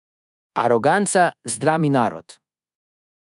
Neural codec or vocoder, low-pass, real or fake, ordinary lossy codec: codec, 24 kHz, 1.2 kbps, DualCodec; 10.8 kHz; fake; none